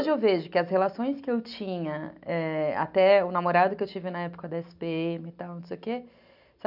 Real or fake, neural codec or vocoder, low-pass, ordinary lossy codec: real; none; 5.4 kHz; none